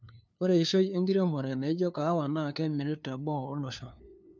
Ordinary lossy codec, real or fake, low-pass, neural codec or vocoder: none; fake; none; codec, 16 kHz, 2 kbps, FunCodec, trained on LibriTTS, 25 frames a second